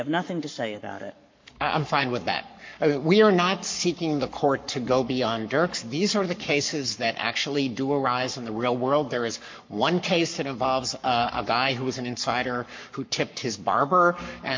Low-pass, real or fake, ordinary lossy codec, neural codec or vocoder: 7.2 kHz; fake; MP3, 48 kbps; codec, 44.1 kHz, 7.8 kbps, Pupu-Codec